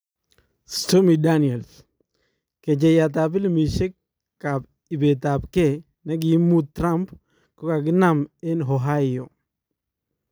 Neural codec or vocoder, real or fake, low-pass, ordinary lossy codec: none; real; none; none